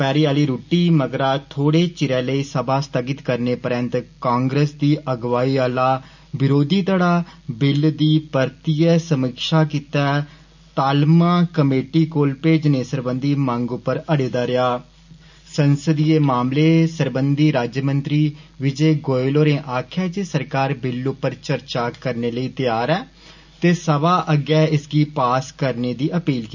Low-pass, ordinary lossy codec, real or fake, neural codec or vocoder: 7.2 kHz; none; real; none